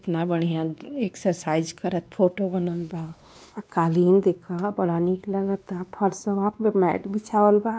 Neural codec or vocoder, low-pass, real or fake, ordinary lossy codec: codec, 16 kHz, 2 kbps, X-Codec, WavLM features, trained on Multilingual LibriSpeech; none; fake; none